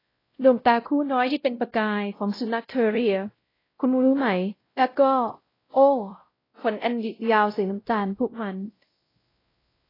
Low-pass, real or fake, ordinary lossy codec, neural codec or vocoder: 5.4 kHz; fake; AAC, 24 kbps; codec, 16 kHz, 0.5 kbps, X-Codec, WavLM features, trained on Multilingual LibriSpeech